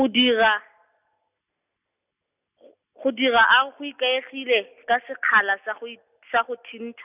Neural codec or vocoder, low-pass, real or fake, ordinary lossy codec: none; 3.6 kHz; real; none